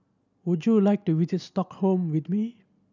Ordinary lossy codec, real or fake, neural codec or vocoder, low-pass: none; real; none; 7.2 kHz